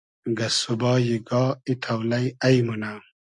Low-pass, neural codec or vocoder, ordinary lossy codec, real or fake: 10.8 kHz; none; MP3, 64 kbps; real